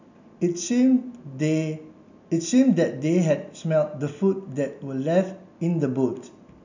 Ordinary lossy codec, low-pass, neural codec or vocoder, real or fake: none; 7.2 kHz; none; real